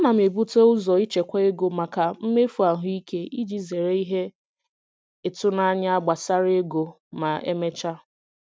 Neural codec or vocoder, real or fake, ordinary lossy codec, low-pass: none; real; none; none